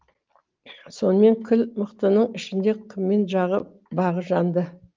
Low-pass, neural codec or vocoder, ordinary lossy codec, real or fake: 7.2 kHz; none; Opus, 32 kbps; real